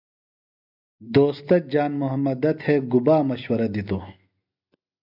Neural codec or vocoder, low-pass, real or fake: none; 5.4 kHz; real